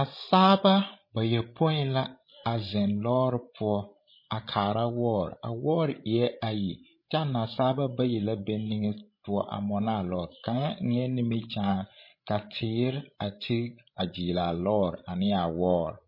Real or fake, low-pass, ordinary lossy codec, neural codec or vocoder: fake; 5.4 kHz; MP3, 24 kbps; codec, 16 kHz, 16 kbps, FreqCodec, larger model